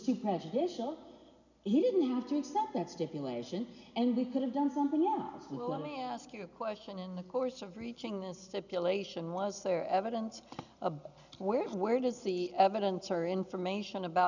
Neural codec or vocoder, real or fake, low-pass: none; real; 7.2 kHz